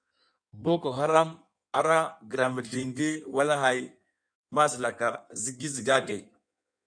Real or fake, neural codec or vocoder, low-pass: fake; codec, 16 kHz in and 24 kHz out, 1.1 kbps, FireRedTTS-2 codec; 9.9 kHz